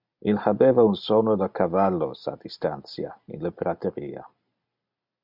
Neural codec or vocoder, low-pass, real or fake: none; 5.4 kHz; real